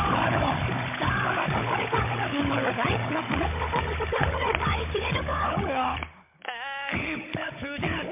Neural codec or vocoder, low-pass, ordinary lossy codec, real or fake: codec, 16 kHz, 16 kbps, FunCodec, trained on Chinese and English, 50 frames a second; 3.6 kHz; MP3, 24 kbps; fake